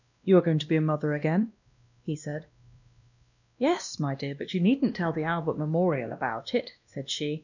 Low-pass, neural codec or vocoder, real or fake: 7.2 kHz; codec, 16 kHz, 1 kbps, X-Codec, WavLM features, trained on Multilingual LibriSpeech; fake